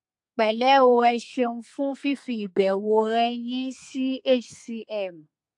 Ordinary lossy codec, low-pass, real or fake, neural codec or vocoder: AAC, 64 kbps; 10.8 kHz; fake; codec, 32 kHz, 1.9 kbps, SNAC